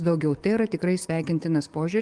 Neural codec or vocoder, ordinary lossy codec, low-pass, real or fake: codec, 44.1 kHz, 7.8 kbps, DAC; Opus, 24 kbps; 10.8 kHz; fake